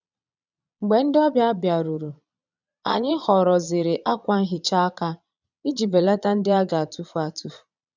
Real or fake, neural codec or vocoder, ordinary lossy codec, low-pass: fake; vocoder, 22.05 kHz, 80 mel bands, Vocos; none; 7.2 kHz